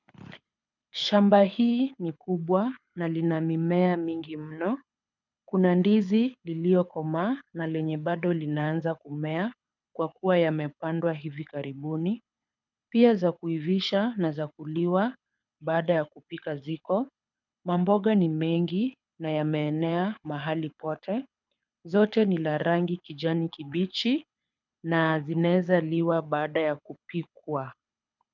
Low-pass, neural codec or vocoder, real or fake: 7.2 kHz; codec, 24 kHz, 6 kbps, HILCodec; fake